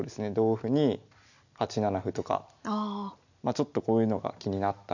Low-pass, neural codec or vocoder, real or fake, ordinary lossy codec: 7.2 kHz; none; real; none